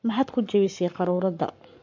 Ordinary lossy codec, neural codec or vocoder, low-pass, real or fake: MP3, 48 kbps; codec, 44.1 kHz, 7.8 kbps, Pupu-Codec; 7.2 kHz; fake